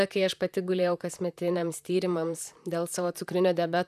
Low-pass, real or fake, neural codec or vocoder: 14.4 kHz; fake; vocoder, 44.1 kHz, 128 mel bands, Pupu-Vocoder